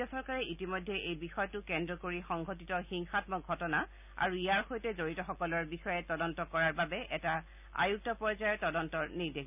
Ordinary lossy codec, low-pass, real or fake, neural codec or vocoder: none; 3.6 kHz; real; none